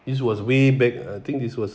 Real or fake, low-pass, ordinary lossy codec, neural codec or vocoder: real; none; none; none